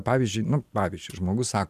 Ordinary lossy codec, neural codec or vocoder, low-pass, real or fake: MP3, 96 kbps; none; 14.4 kHz; real